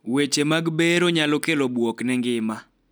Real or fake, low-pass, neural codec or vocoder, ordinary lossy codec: real; none; none; none